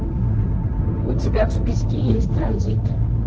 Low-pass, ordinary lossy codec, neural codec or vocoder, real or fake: 7.2 kHz; Opus, 16 kbps; codec, 16 kHz, 1.1 kbps, Voila-Tokenizer; fake